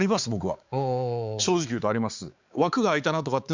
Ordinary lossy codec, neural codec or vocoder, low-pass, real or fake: Opus, 64 kbps; codec, 16 kHz, 4 kbps, X-Codec, HuBERT features, trained on balanced general audio; 7.2 kHz; fake